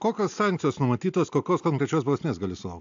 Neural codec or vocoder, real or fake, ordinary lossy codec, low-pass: none; real; AAC, 64 kbps; 7.2 kHz